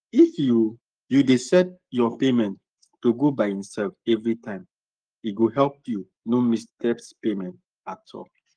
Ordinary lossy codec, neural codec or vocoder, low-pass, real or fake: Opus, 24 kbps; codec, 44.1 kHz, 7.8 kbps, Pupu-Codec; 9.9 kHz; fake